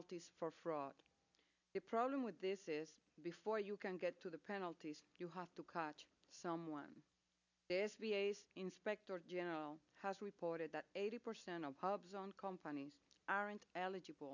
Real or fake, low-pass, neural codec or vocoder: real; 7.2 kHz; none